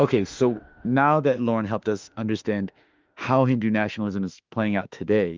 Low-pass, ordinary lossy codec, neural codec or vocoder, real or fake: 7.2 kHz; Opus, 32 kbps; autoencoder, 48 kHz, 32 numbers a frame, DAC-VAE, trained on Japanese speech; fake